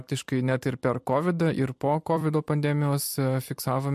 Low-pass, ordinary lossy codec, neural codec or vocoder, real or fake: 14.4 kHz; MP3, 64 kbps; vocoder, 44.1 kHz, 128 mel bands every 256 samples, BigVGAN v2; fake